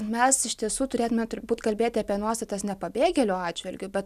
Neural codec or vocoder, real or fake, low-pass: none; real; 14.4 kHz